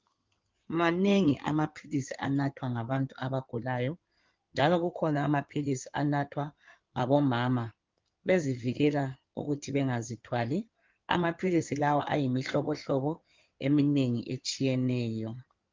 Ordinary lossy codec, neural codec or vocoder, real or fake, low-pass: Opus, 32 kbps; codec, 16 kHz in and 24 kHz out, 2.2 kbps, FireRedTTS-2 codec; fake; 7.2 kHz